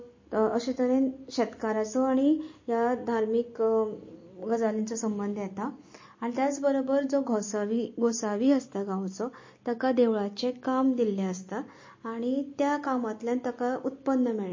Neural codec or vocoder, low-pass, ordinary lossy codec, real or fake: none; 7.2 kHz; MP3, 32 kbps; real